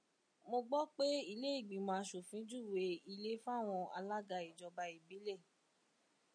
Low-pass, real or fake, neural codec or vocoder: 9.9 kHz; real; none